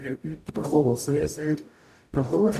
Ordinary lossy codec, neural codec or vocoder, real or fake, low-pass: none; codec, 44.1 kHz, 0.9 kbps, DAC; fake; 14.4 kHz